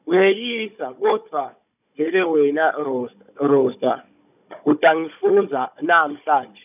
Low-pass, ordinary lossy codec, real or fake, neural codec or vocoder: 3.6 kHz; none; fake; codec, 16 kHz, 16 kbps, FunCodec, trained on Chinese and English, 50 frames a second